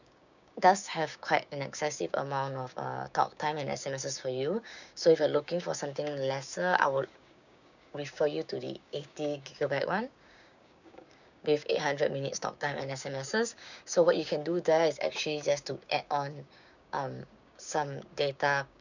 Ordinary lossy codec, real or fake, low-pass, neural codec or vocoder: none; fake; 7.2 kHz; codec, 16 kHz, 6 kbps, DAC